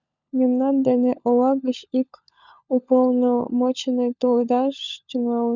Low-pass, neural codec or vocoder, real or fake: 7.2 kHz; codec, 16 kHz, 16 kbps, FunCodec, trained on LibriTTS, 50 frames a second; fake